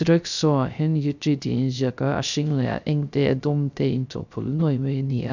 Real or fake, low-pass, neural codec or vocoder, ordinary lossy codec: fake; 7.2 kHz; codec, 16 kHz, 0.3 kbps, FocalCodec; none